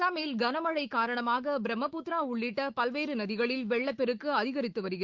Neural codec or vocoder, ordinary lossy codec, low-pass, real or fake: autoencoder, 48 kHz, 128 numbers a frame, DAC-VAE, trained on Japanese speech; Opus, 16 kbps; 7.2 kHz; fake